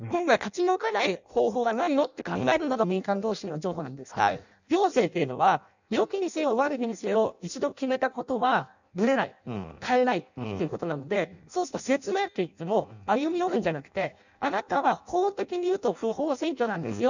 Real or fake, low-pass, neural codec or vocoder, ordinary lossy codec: fake; 7.2 kHz; codec, 16 kHz in and 24 kHz out, 0.6 kbps, FireRedTTS-2 codec; none